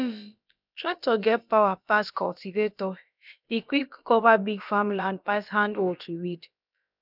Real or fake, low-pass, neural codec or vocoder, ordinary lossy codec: fake; 5.4 kHz; codec, 16 kHz, about 1 kbps, DyCAST, with the encoder's durations; none